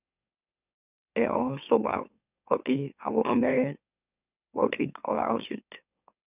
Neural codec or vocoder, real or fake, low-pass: autoencoder, 44.1 kHz, a latent of 192 numbers a frame, MeloTTS; fake; 3.6 kHz